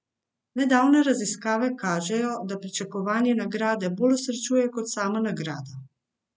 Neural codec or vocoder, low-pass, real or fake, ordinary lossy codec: none; none; real; none